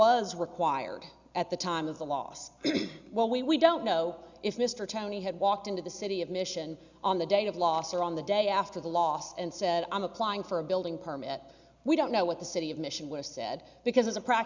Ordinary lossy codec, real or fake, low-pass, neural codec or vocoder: Opus, 64 kbps; real; 7.2 kHz; none